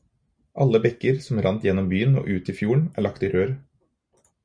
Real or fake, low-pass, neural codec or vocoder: real; 9.9 kHz; none